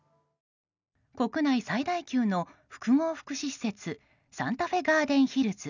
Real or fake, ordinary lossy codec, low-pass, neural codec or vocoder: real; none; 7.2 kHz; none